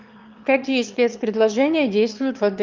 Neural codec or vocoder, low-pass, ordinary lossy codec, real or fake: autoencoder, 22.05 kHz, a latent of 192 numbers a frame, VITS, trained on one speaker; 7.2 kHz; Opus, 24 kbps; fake